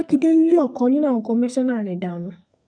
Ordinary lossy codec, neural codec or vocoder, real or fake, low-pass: none; codec, 32 kHz, 1.9 kbps, SNAC; fake; 9.9 kHz